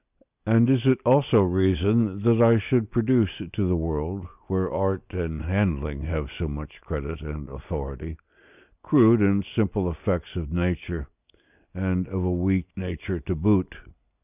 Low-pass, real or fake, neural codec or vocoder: 3.6 kHz; real; none